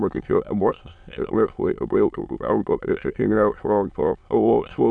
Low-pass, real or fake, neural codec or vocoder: 9.9 kHz; fake; autoencoder, 22.05 kHz, a latent of 192 numbers a frame, VITS, trained on many speakers